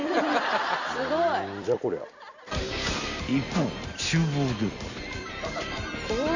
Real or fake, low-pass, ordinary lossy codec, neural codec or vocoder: fake; 7.2 kHz; none; vocoder, 44.1 kHz, 128 mel bands every 256 samples, BigVGAN v2